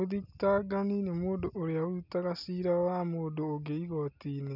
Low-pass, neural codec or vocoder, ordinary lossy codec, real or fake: 5.4 kHz; none; none; real